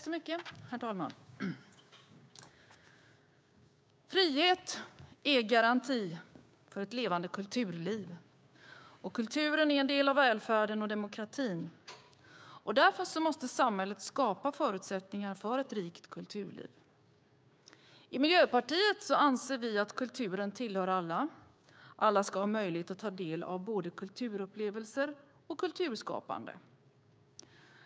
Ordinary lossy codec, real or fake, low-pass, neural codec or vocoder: none; fake; none; codec, 16 kHz, 6 kbps, DAC